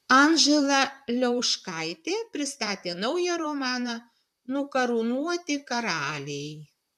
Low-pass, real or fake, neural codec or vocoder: 14.4 kHz; fake; vocoder, 44.1 kHz, 128 mel bands, Pupu-Vocoder